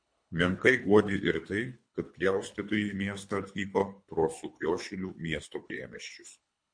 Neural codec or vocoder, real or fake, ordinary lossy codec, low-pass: codec, 24 kHz, 3 kbps, HILCodec; fake; MP3, 48 kbps; 9.9 kHz